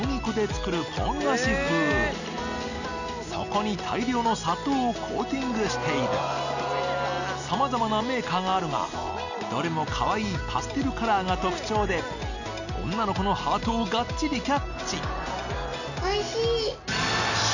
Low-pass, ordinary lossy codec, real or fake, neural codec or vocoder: 7.2 kHz; none; real; none